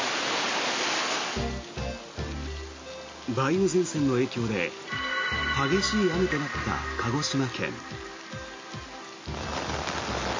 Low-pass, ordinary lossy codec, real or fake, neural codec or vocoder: 7.2 kHz; MP3, 32 kbps; real; none